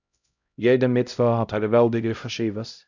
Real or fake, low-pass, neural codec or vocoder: fake; 7.2 kHz; codec, 16 kHz, 0.5 kbps, X-Codec, HuBERT features, trained on LibriSpeech